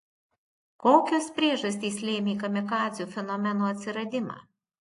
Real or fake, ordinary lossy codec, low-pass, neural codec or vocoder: real; AAC, 48 kbps; 10.8 kHz; none